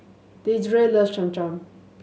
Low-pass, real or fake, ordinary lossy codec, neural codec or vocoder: none; real; none; none